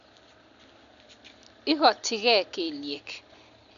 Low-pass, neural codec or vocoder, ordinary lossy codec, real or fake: 7.2 kHz; none; none; real